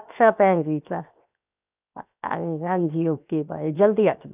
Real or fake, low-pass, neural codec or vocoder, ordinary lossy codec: fake; 3.6 kHz; codec, 16 kHz, 0.7 kbps, FocalCodec; none